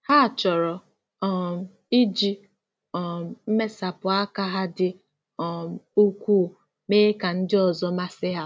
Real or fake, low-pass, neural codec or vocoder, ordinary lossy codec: real; none; none; none